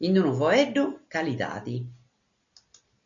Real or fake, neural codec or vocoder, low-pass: real; none; 7.2 kHz